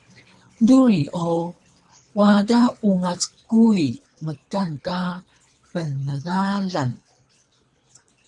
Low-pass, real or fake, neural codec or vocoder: 10.8 kHz; fake; codec, 24 kHz, 3 kbps, HILCodec